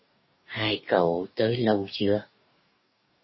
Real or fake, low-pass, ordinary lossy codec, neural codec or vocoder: fake; 7.2 kHz; MP3, 24 kbps; codec, 44.1 kHz, 2.6 kbps, DAC